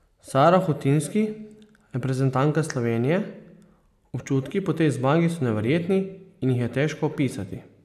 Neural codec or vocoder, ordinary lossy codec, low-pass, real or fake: none; none; 14.4 kHz; real